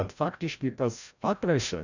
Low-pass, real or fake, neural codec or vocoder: 7.2 kHz; fake; codec, 16 kHz, 0.5 kbps, FreqCodec, larger model